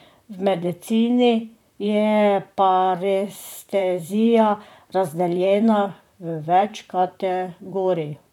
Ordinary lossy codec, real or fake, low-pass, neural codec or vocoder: none; fake; 19.8 kHz; vocoder, 44.1 kHz, 128 mel bands, Pupu-Vocoder